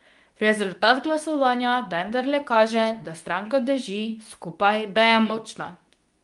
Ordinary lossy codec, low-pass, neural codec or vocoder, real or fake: Opus, 32 kbps; 10.8 kHz; codec, 24 kHz, 0.9 kbps, WavTokenizer, medium speech release version 2; fake